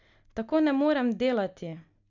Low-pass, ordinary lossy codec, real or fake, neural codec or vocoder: 7.2 kHz; none; real; none